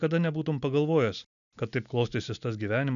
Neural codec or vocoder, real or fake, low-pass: none; real; 7.2 kHz